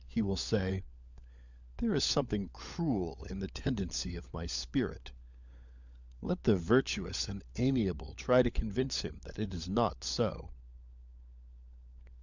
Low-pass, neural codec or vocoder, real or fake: 7.2 kHz; codec, 16 kHz, 16 kbps, FunCodec, trained on LibriTTS, 50 frames a second; fake